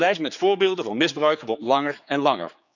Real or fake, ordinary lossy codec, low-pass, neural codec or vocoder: fake; none; 7.2 kHz; codec, 16 kHz, 4 kbps, X-Codec, HuBERT features, trained on general audio